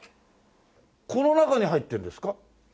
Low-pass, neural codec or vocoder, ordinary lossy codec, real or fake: none; none; none; real